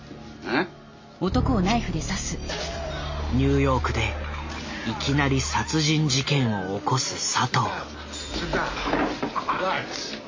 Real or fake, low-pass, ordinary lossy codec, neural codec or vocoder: real; 7.2 kHz; MP3, 32 kbps; none